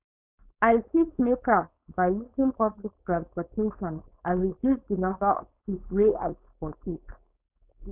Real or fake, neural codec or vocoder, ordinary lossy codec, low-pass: fake; codec, 16 kHz, 4.8 kbps, FACodec; none; 3.6 kHz